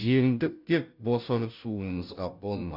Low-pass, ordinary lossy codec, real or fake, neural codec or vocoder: 5.4 kHz; none; fake; codec, 16 kHz, 0.5 kbps, FunCodec, trained on Chinese and English, 25 frames a second